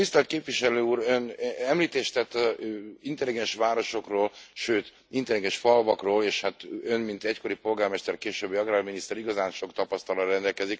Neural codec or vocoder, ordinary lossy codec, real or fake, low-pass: none; none; real; none